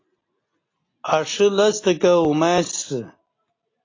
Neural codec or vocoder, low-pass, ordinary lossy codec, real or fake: none; 7.2 kHz; AAC, 32 kbps; real